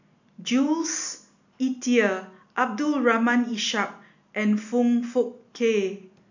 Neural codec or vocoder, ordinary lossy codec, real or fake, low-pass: none; none; real; 7.2 kHz